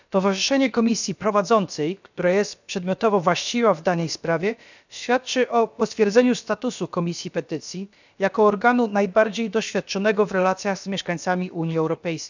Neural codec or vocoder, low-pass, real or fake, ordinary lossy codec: codec, 16 kHz, about 1 kbps, DyCAST, with the encoder's durations; 7.2 kHz; fake; none